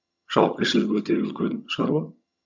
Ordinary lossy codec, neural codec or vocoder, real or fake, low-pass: none; vocoder, 22.05 kHz, 80 mel bands, HiFi-GAN; fake; 7.2 kHz